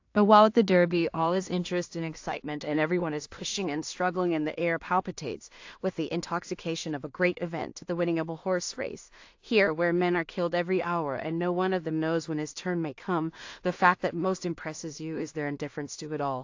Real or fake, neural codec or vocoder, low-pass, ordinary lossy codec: fake; codec, 16 kHz in and 24 kHz out, 0.4 kbps, LongCat-Audio-Codec, two codebook decoder; 7.2 kHz; AAC, 48 kbps